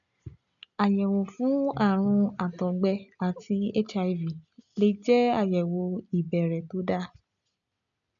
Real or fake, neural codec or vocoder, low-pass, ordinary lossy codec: real; none; 7.2 kHz; none